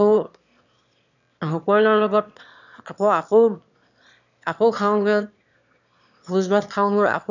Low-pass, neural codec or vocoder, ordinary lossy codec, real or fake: 7.2 kHz; autoencoder, 22.05 kHz, a latent of 192 numbers a frame, VITS, trained on one speaker; none; fake